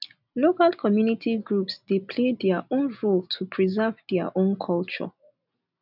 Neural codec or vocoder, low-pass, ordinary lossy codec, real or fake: none; 5.4 kHz; none; real